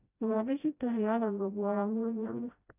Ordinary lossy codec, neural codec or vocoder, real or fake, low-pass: none; codec, 16 kHz, 0.5 kbps, FreqCodec, smaller model; fake; 3.6 kHz